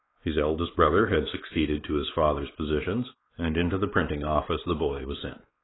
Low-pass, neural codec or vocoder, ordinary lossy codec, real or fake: 7.2 kHz; codec, 16 kHz, 4 kbps, X-Codec, WavLM features, trained on Multilingual LibriSpeech; AAC, 16 kbps; fake